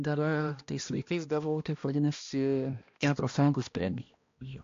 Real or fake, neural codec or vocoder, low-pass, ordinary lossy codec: fake; codec, 16 kHz, 1 kbps, X-Codec, HuBERT features, trained on balanced general audio; 7.2 kHz; AAC, 48 kbps